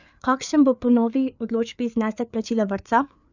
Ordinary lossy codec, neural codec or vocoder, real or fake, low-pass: none; codec, 16 kHz, 4 kbps, FreqCodec, larger model; fake; 7.2 kHz